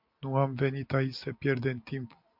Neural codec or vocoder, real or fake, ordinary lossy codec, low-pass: none; real; MP3, 48 kbps; 5.4 kHz